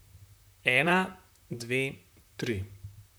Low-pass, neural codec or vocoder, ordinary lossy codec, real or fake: none; vocoder, 44.1 kHz, 128 mel bands, Pupu-Vocoder; none; fake